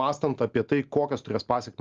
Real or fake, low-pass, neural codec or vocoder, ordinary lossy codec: real; 7.2 kHz; none; Opus, 32 kbps